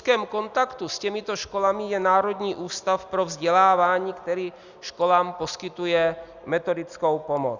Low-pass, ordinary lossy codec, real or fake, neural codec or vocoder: 7.2 kHz; Opus, 64 kbps; real; none